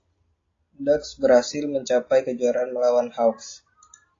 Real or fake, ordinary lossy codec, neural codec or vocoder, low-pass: real; AAC, 32 kbps; none; 7.2 kHz